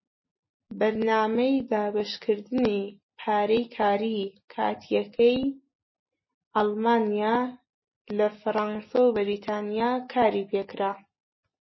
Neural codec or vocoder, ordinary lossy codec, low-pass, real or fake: none; MP3, 24 kbps; 7.2 kHz; real